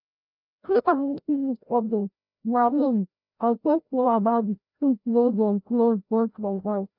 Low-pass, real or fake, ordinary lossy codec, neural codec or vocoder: 5.4 kHz; fake; none; codec, 16 kHz, 0.5 kbps, FreqCodec, larger model